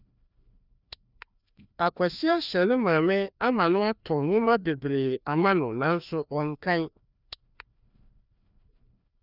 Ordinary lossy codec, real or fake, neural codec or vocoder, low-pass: none; fake; codec, 16 kHz, 1 kbps, FreqCodec, larger model; 5.4 kHz